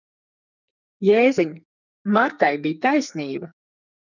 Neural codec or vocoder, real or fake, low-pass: codec, 44.1 kHz, 2.6 kbps, SNAC; fake; 7.2 kHz